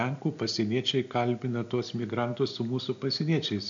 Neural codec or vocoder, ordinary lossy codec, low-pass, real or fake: none; AAC, 64 kbps; 7.2 kHz; real